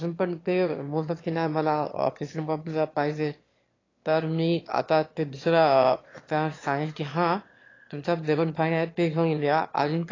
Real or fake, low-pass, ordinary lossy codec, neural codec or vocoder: fake; 7.2 kHz; AAC, 32 kbps; autoencoder, 22.05 kHz, a latent of 192 numbers a frame, VITS, trained on one speaker